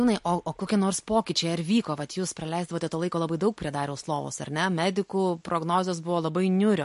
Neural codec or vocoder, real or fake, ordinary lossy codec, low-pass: none; real; MP3, 48 kbps; 14.4 kHz